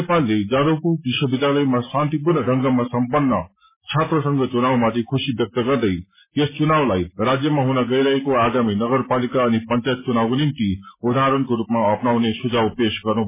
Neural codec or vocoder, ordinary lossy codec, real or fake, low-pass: none; MP3, 16 kbps; real; 3.6 kHz